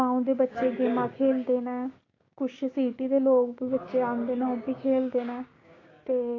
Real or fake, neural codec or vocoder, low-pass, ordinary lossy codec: fake; codec, 16 kHz, 6 kbps, DAC; 7.2 kHz; AAC, 32 kbps